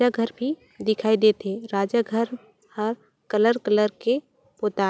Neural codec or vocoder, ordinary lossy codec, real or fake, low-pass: none; none; real; none